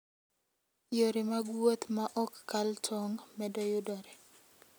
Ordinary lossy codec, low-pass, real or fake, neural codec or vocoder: none; none; real; none